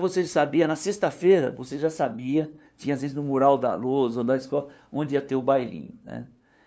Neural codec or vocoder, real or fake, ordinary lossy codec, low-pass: codec, 16 kHz, 2 kbps, FunCodec, trained on LibriTTS, 25 frames a second; fake; none; none